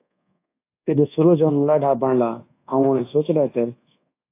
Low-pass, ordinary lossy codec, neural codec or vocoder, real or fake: 3.6 kHz; AAC, 24 kbps; codec, 24 kHz, 1.2 kbps, DualCodec; fake